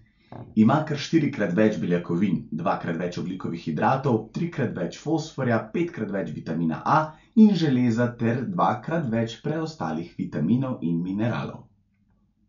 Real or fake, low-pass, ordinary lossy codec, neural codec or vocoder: real; 7.2 kHz; none; none